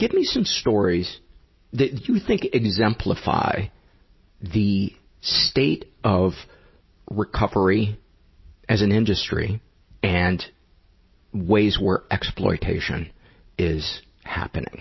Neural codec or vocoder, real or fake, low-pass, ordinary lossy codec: none; real; 7.2 kHz; MP3, 24 kbps